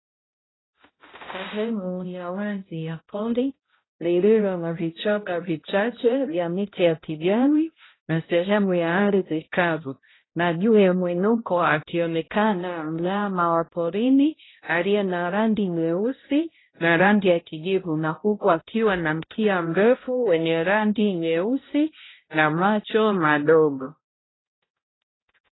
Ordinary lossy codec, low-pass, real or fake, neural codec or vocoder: AAC, 16 kbps; 7.2 kHz; fake; codec, 16 kHz, 0.5 kbps, X-Codec, HuBERT features, trained on balanced general audio